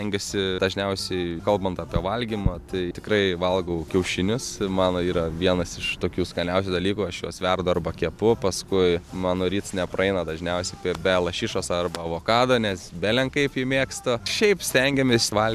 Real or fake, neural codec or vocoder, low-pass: real; none; 14.4 kHz